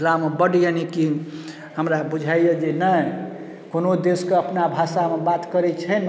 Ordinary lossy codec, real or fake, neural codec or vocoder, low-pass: none; real; none; none